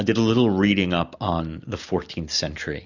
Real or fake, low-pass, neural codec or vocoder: real; 7.2 kHz; none